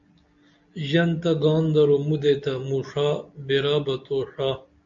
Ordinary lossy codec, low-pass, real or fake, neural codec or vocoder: MP3, 64 kbps; 7.2 kHz; real; none